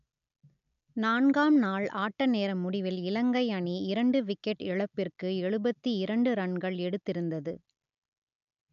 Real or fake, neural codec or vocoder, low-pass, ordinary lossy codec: real; none; 7.2 kHz; none